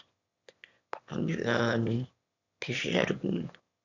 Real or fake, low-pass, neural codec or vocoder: fake; 7.2 kHz; autoencoder, 22.05 kHz, a latent of 192 numbers a frame, VITS, trained on one speaker